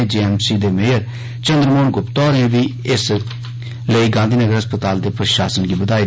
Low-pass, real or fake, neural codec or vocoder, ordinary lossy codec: none; real; none; none